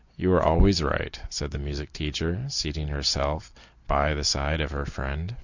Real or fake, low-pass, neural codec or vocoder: real; 7.2 kHz; none